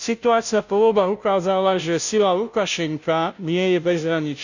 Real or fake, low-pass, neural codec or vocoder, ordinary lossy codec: fake; 7.2 kHz; codec, 16 kHz, 0.5 kbps, FunCodec, trained on Chinese and English, 25 frames a second; none